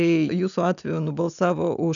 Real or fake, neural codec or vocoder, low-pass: real; none; 7.2 kHz